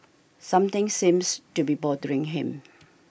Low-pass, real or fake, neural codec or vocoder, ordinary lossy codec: none; real; none; none